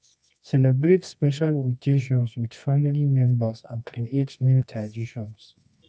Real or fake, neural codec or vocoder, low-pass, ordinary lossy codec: fake; codec, 24 kHz, 0.9 kbps, WavTokenizer, medium music audio release; 9.9 kHz; none